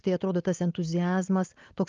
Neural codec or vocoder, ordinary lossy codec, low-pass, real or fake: none; Opus, 24 kbps; 7.2 kHz; real